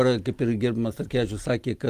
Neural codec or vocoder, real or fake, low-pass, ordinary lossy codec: none; real; 14.4 kHz; Opus, 24 kbps